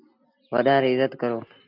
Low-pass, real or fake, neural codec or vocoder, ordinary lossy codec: 5.4 kHz; real; none; MP3, 48 kbps